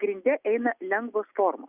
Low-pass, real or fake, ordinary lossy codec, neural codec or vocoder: 3.6 kHz; real; Opus, 64 kbps; none